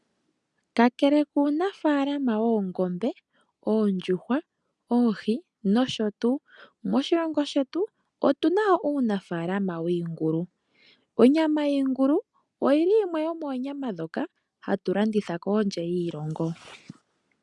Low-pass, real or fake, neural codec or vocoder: 10.8 kHz; real; none